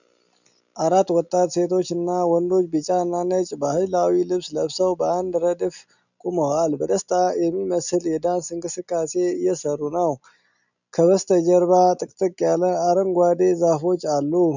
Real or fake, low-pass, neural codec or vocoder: real; 7.2 kHz; none